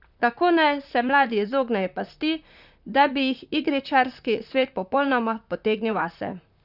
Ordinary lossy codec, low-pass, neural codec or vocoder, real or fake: none; 5.4 kHz; vocoder, 44.1 kHz, 128 mel bands, Pupu-Vocoder; fake